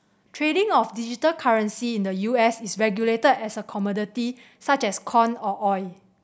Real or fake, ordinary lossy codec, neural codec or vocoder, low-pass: real; none; none; none